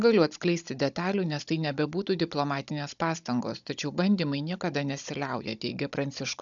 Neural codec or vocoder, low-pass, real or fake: codec, 16 kHz, 16 kbps, FunCodec, trained on Chinese and English, 50 frames a second; 7.2 kHz; fake